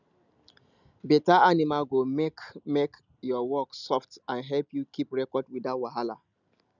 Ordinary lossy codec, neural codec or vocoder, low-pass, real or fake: none; none; 7.2 kHz; real